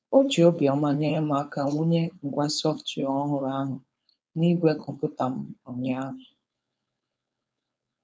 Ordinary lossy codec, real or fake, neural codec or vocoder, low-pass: none; fake; codec, 16 kHz, 4.8 kbps, FACodec; none